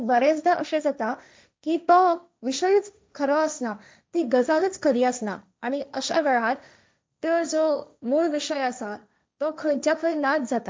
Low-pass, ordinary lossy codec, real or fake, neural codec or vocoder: none; none; fake; codec, 16 kHz, 1.1 kbps, Voila-Tokenizer